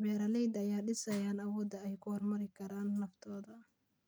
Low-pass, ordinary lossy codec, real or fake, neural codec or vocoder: none; none; real; none